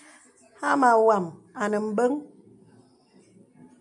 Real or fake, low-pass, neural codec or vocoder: real; 10.8 kHz; none